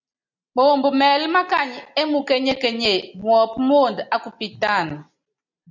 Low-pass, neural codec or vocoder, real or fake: 7.2 kHz; none; real